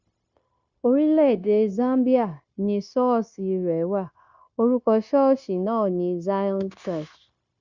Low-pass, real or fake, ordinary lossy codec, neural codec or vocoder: 7.2 kHz; fake; Opus, 64 kbps; codec, 16 kHz, 0.9 kbps, LongCat-Audio-Codec